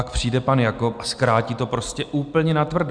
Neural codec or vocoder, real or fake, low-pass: none; real; 9.9 kHz